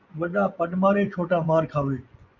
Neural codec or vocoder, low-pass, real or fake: vocoder, 24 kHz, 100 mel bands, Vocos; 7.2 kHz; fake